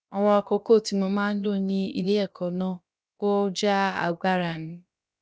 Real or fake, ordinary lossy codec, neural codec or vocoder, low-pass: fake; none; codec, 16 kHz, about 1 kbps, DyCAST, with the encoder's durations; none